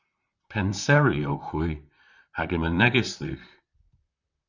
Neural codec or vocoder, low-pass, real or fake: vocoder, 44.1 kHz, 128 mel bands, Pupu-Vocoder; 7.2 kHz; fake